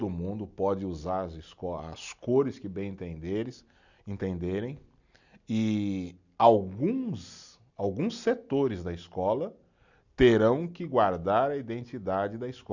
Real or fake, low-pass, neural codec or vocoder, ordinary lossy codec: real; 7.2 kHz; none; none